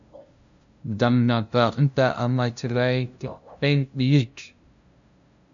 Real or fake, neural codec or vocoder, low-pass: fake; codec, 16 kHz, 0.5 kbps, FunCodec, trained on LibriTTS, 25 frames a second; 7.2 kHz